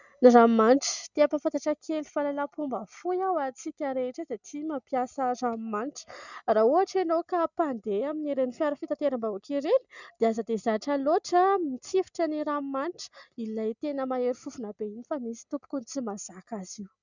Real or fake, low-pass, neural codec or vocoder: real; 7.2 kHz; none